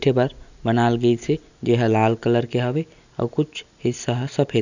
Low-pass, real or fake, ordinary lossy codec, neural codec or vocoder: 7.2 kHz; real; none; none